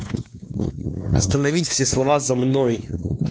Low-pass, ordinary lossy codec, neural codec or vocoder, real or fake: none; none; codec, 16 kHz, 2 kbps, X-Codec, WavLM features, trained on Multilingual LibriSpeech; fake